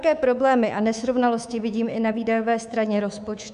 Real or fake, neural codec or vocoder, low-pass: fake; codec, 24 kHz, 3.1 kbps, DualCodec; 10.8 kHz